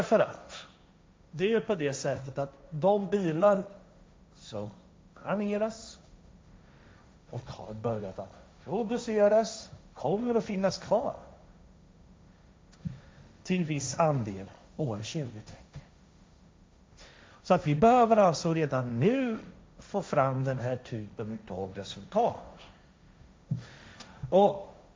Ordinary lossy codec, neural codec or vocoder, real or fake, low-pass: none; codec, 16 kHz, 1.1 kbps, Voila-Tokenizer; fake; none